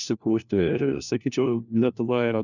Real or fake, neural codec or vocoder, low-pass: fake; codec, 16 kHz, 1 kbps, FunCodec, trained on LibriTTS, 50 frames a second; 7.2 kHz